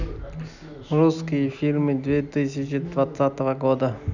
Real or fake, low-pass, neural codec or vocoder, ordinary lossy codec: real; 7.2 kHz; none; none